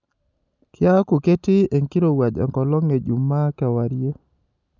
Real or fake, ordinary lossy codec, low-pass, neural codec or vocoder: real; none; 7.2 kHz; none